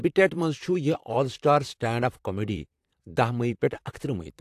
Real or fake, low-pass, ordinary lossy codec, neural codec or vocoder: fake; 14.4 kHz; AAC, 64 kbps; vocoder, 44.1 kHz, 128 mel bands, Pupu-Vocoder